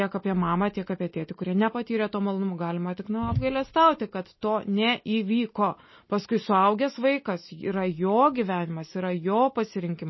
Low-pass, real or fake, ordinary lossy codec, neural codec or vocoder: 7.2 kHz; real; MP3, 24 kbps; none